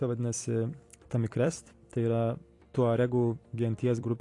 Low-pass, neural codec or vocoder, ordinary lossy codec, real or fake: 10.8 kHz; vocoder, 44.1 kHz, 128 mel bands every 256 samples, BigVGAN v2; AAC, 48 kbps; fake